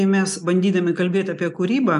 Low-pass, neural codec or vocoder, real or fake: 10.8 kHz; none; real